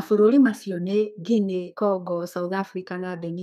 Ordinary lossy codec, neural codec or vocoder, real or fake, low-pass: none; codec, 32 kHz, 1.9 kbps, SNAC; fake; 14.4 kHz